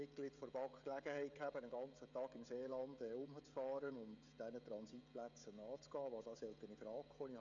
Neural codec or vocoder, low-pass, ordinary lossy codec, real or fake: codec, 16 kHz, 16 kbps, FreqCodec, smaller model; 7.2 kHz; none; fake